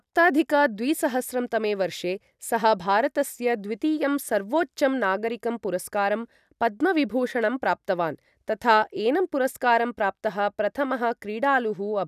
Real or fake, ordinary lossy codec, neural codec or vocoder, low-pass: real; none; none; 14.4 kHz